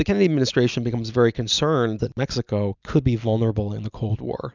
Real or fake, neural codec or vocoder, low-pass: real; none; 7.2 kHz